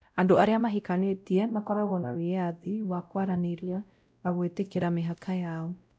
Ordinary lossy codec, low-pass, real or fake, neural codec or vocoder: none; none; fake; codec, 16 kHz, 0.5 kbps, X-Codec, WavLM features, trained on Multilingual LibriSpeech